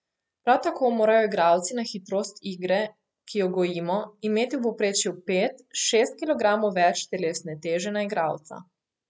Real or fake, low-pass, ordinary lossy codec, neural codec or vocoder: real; none; none; none